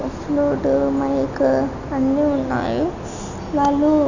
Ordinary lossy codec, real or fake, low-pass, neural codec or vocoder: none; real; 7.2 kHz; none